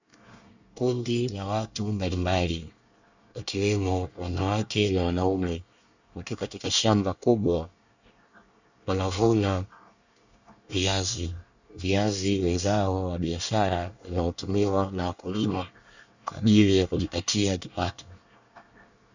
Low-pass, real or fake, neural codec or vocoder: 7.2 kHz; fake; codec, 24 kHz, 1 kbps, SNAC